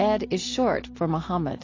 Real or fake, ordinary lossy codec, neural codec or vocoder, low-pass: real; AAC, 32 kbps; none; 7.2 kHz